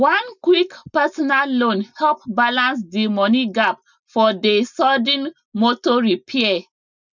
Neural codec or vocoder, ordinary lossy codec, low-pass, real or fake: none; none; 7.2 kHz; real